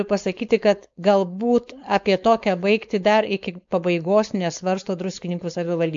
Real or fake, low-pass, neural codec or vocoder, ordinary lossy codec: fake; 7.2 kHz; codec, 16 kHz, 4.8 kbps, FACodec; MP3, 64 kbps